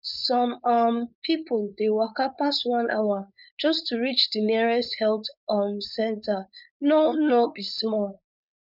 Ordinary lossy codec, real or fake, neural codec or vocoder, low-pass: none; fake; codec, 16 kHz, 4.8 kbps, FACodec; 5.4 kHz